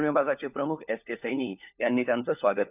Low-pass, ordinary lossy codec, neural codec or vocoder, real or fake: 3.6 kHz; none; codec, 16 kHz, 4 kbps, FunCodec, trained on LibriTTS, 50 frames a second; fake